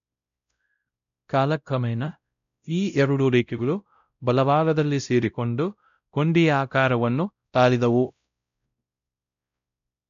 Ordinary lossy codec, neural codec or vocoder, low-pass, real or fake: none; codec, 16 kHz, 0.5 kbps, X-Codec, WavLM features, trained on Multilingual LibriSpeech; 7.2 kHz; fake